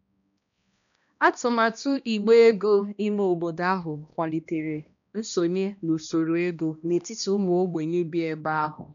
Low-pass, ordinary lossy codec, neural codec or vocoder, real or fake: 7.2 kHz; none; codec, 16 kHz, 1 kbps, X-Codec, HuBERT features, trained on balanced general audio; fake